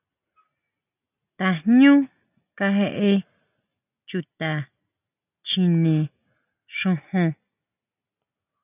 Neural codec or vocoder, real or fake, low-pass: none; real; 3.6 kHz